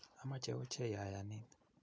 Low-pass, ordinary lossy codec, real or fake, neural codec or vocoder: none; none; real; none